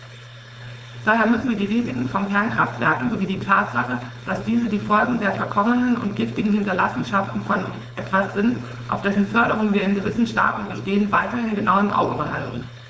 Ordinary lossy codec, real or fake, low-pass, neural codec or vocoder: none; fake; none; codec, 16 kHz, 4.8 kbps, FACodec